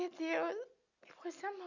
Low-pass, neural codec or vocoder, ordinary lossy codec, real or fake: 7.2 kHz; none; none; real